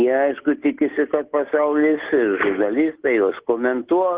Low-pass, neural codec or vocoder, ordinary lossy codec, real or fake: 3.6 kHz; none; Opus, 16 kbps; real